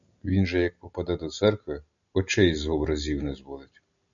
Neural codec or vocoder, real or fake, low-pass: none; real; 7.2 kHz